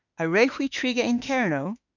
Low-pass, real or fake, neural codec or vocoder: 7.2 kHz; fake; autoencoder, 48 kHz, 32 numbers a frame, DAC-VAE, trained on Japanese speech